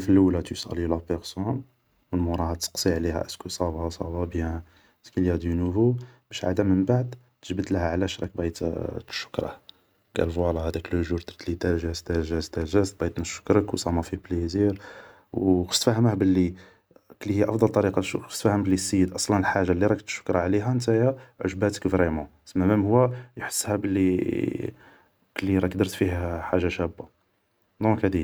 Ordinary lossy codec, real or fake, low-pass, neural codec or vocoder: none; real; none; none